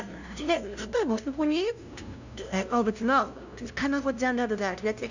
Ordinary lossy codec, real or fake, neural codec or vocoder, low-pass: none; fake; codec, 16 kHz, 0.5 kbps, FunCodec, trained on LibriTTS, 25 frames a second; 7.2 kHz